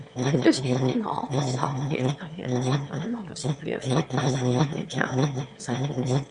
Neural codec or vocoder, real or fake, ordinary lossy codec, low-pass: autoencoder, 22.05 kHz, a latent of 192 numbers a frame, VITS, trained on one speaker; fake; Opus, 64 kbps; 9.9 kHz